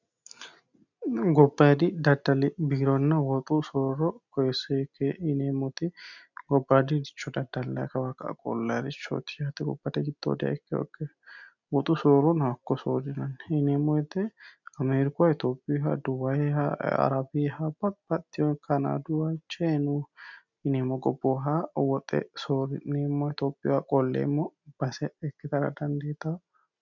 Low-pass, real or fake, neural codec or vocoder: 7.2 kHz; real; none